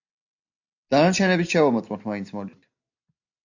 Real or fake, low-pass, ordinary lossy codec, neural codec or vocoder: real; 7.2 kHz; AAC, 48 kbps; none